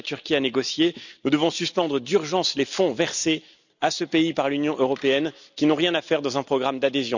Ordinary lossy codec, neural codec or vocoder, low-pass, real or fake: none; none; 7.2 kHz; real